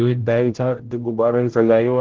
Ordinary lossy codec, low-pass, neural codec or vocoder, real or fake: Opus, 16 kbps; 7.2 kHz; codec, 16 kHz, 0.5 kbps, X-Codec, HuBERT features, trained on general audio; fake